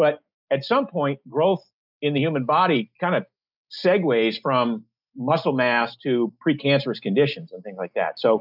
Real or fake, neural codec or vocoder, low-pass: real; none; 5.4 kHz